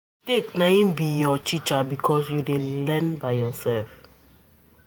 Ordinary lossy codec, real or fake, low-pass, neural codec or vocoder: none; fake; none; vocoder, 48 kHz, 128 mel bands, Vocos